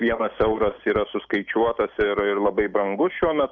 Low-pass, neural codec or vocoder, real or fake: 7.2 kHz; none; real